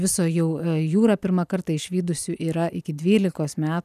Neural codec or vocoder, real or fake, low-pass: none; real; 14.4 kHz